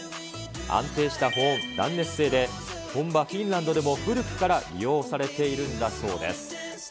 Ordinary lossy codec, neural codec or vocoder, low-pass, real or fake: none; none; none; real